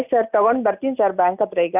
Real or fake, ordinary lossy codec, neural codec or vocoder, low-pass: real; none; none; 3.6 kHz